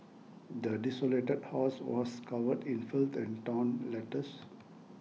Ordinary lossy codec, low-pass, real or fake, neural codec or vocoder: none; none; real; none